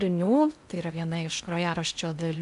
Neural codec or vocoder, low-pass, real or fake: codec, 16 kHz in and 24 kHz out, 0.6 kbps, FocalCodec, streaming, 2048 codes; 10.8 kHz; fake